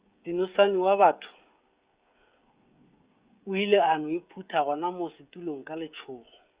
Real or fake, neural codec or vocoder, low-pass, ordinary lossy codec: fake; codec, 16 kHz, 16 kbps, FreqCodec, smaller model; 3.6 kHz; Opus, 64 kbps